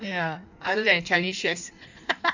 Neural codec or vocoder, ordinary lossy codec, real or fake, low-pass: codec, 16 kHz in and 24 kHz out, 1.1 kbps, FireRedTTS-2 codec; none; fake; 7.2 kHz